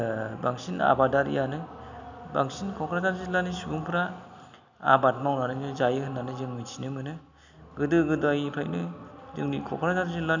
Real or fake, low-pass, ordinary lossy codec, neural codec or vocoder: real; 7.2 kHz; none; none